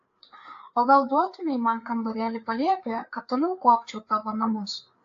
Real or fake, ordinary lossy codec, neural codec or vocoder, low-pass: fake; AAC, 48 kbps; codec, 16 kHz, 4 kbps, FreqCodec, larger model; 7.2 kHz